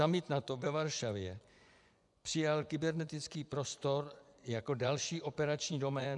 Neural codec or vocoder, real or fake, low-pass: vocoder, 24 kHz, 100 mel bands, Vocos; fake; 10.8 kHz